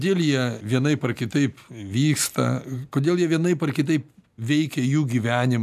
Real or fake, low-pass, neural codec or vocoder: real; 14.4 kHz; none